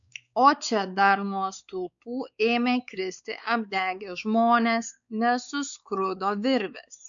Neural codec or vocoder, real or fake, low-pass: codec, 16 kHz, 4 kbps, X-Codec, WavLM features, trained on Multilingual LibriSpeech; fake; 7.2 kHz